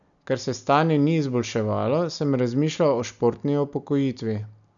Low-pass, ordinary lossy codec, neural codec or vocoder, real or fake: 7.2 kHz; none; none; real